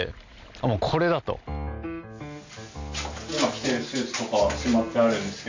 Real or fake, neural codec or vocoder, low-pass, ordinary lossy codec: real; none; 7.2 kHz; AAC, 48 kbps